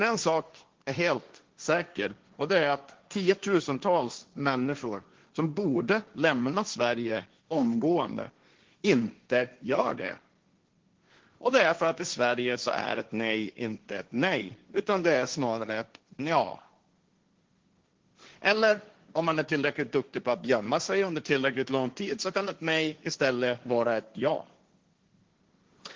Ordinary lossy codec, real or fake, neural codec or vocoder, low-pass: Opus, 16 kbps; fake; codec, 16 kHz, 1.1 kbps, Voila-Tokenizer; 7.2 kHz